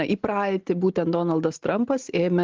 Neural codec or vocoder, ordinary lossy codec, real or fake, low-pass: none; Opus, 16 kbps; real; 7.2 kHz